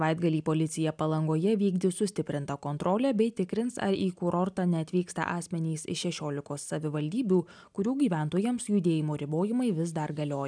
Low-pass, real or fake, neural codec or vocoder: 9.9 kHz; real; none